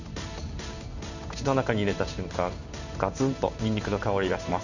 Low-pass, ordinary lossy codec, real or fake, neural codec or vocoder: 7.2 kHz; none; fake; codec, 16 kHz in and 24 kHz out, 1 kbps, XY-Tokenizer